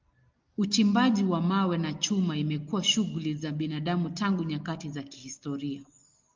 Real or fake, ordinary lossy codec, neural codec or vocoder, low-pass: real; Opus, 32 kbps; none; 7.2 kHz